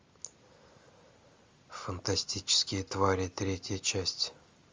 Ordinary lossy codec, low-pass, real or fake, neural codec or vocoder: Opus, 32 kbps; 7.2 kHz; real; none